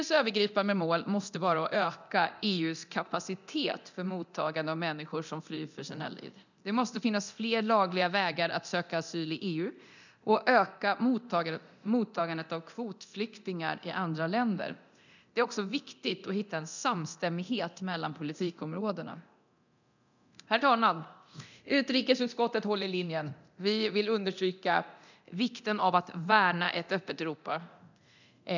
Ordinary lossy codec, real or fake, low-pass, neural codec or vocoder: none; fake; 7.2 kHz; codec, 24 kHz, 0.9 kbps, DualCodec